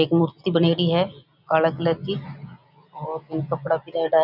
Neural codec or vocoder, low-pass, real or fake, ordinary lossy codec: none; 5.4 kHz; real; none